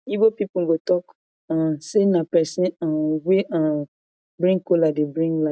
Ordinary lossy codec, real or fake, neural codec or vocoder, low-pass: none; real; none; none